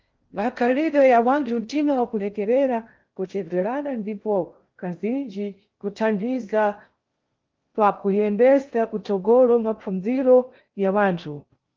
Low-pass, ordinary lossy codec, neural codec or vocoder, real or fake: 7.2 kHz; Opus, 32 kbps; codec, 16 kHz in and 24 kHz out, 0.6 kbps, FocalCodec, streaming, 2048 codes; fake